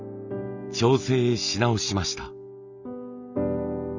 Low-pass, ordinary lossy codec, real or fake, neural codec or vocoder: 7.2 kHz; none; real; none